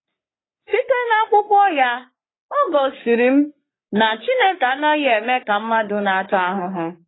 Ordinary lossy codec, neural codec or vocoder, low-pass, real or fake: AAC, 16 kbps; codec, 44.1 kHz, 3.4 kbps, Pupu-Codec; 7.2 kHz; fake